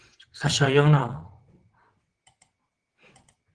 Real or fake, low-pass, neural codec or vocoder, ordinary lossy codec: fake; 9.9 kHz; vocoder, 22.05 kHz, 80 mel bands, WaveNeXt; Opus, 16 kbps